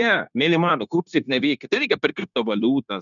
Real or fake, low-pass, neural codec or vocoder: fake; 7.2 kHz; codec, 16 kHz, 0.9 kbps, LongCat-Audio-Codec